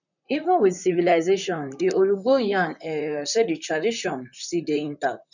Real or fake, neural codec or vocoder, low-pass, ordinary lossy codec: fake; vocoder, 44.1 kHz, 128 mel bands, Pupu-Vocoder; 7.2 kHz; none